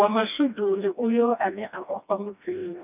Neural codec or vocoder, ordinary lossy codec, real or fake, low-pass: codec, 16 kHz, 1 kbps, FreqCodec, smaller model; none; fake; 3.6 kHz